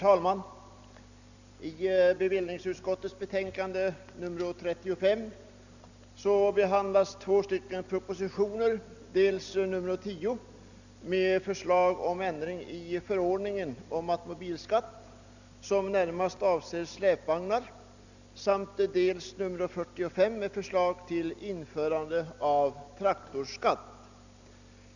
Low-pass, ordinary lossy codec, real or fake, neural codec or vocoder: 7.2 kHz; none; real; none